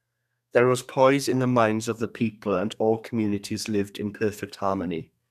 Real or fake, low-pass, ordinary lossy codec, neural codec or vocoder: fake; 14.4 kHz; none; codec, 32 kHz, 1.9 kbps, SNAC